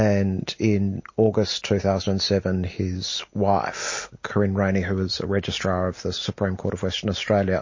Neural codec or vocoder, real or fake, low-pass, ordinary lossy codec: none; real; 7.2 kHz; MP3, 32 kbps